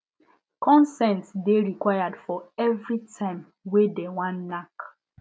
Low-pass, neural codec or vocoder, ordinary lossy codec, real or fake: none; none; none; real